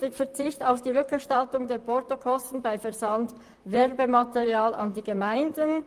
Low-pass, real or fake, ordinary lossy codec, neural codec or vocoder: 14.4 kHz; fake; Opus, 32 kbps; vocoder, 44.1 kHz, 128 mel bands, Pupu-Vocoder